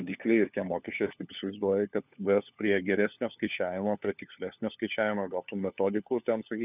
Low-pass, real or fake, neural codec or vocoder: 3.6 kHz; fake; codec, 16 kHz, 4 kbps, FunCodec, trained on LibriTTS, 50 frames a second